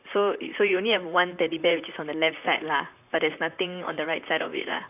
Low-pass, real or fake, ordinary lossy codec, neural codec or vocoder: 3.6 kHz; fake; none; vocoder, 44.1 kHz, 128 mel bands, Pupu-Vocoder